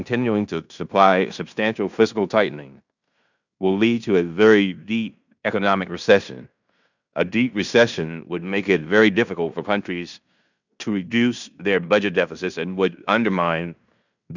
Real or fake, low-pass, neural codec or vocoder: fake; 7.2 kHz; codec, 16 kHz in and 24 kHz out, 0.9 kbps, LongCat-Audio-Codec, fine tuned four codebook decoder